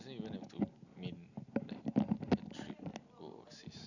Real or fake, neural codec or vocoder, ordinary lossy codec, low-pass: real; none; none; 7.2 kHz